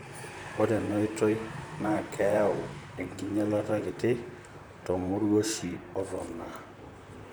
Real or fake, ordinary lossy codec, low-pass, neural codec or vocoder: fake; none; none; vocoder, 44.1 kHz, 128 mel bands, Pupu-Vocoder